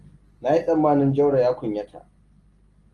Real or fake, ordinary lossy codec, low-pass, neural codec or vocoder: real; Opus, 24 kbps; 10.8 kHz; none